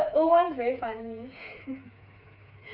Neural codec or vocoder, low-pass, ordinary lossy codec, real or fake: codec, 16 kHz, 4 kbps, FreqCodec, smaller model; 5.4 kHz; none; fake